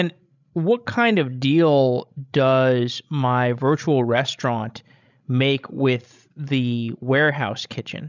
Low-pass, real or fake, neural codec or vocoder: 7.2 kHz; fake; codec, 16 kHz, 16 kbps, FreqCodec, larger model